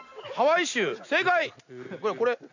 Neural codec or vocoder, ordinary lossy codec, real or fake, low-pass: none; none; real; 7.2 kHz